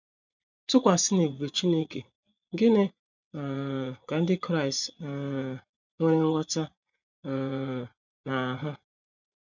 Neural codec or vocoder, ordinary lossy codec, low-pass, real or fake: vocoder, 24 kHz, 100 mel bands, Vocos; none; 7.2 kHz; fake